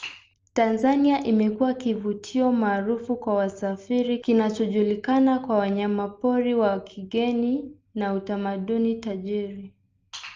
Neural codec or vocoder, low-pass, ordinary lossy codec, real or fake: none; 7.2 kHz; Opus, 24 kbps; real